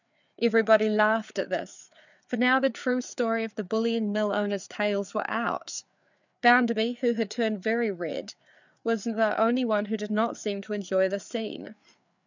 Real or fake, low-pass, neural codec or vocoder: fake; 7.2 kHz; codec, 16 kHz, 4 kbps, FreqCodec, larger model